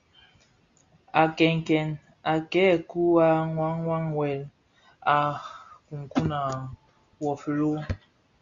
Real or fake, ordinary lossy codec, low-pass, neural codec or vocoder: real; Opus, 64 kbps; 7.2 kHz; none